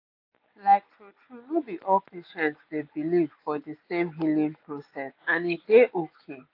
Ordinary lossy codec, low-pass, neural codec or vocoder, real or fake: AAC, 32 kbps; 5.4 kHz; none; real